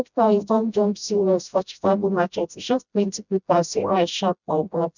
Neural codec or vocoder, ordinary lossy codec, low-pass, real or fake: codec, 16 kHz, 0.5 kbps, FreqCodec, smaller model; none; 7.2 kHz; fake